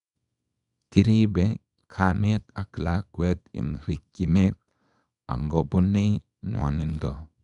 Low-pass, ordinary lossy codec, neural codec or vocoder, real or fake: 10.8 kHz; none; codec, 24 kHz, 0.9 kbps, WavTokenizer, small release; fake